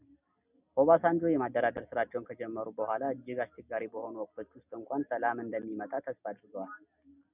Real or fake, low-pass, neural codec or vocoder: real; 3.6 kHz; none